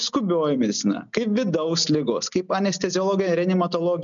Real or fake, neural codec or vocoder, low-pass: real; none; 7.2 kHz